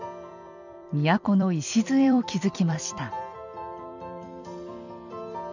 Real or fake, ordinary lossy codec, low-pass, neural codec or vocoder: fake; none; 7.2 kHz; vocoder, 44.1 kHz, 128 mel bands every 256 samples, BigVGAN v2